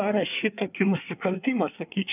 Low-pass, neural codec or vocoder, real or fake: 3.6 kHz; codec, 24 kHz, 1 kbps, SNAC; fake